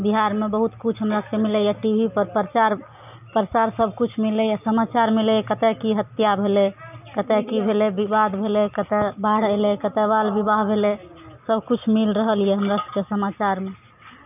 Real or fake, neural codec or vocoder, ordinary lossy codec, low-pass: real; none; none; 3.6 kHz